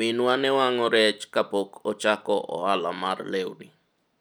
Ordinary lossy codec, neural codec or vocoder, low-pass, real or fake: none; none; none; real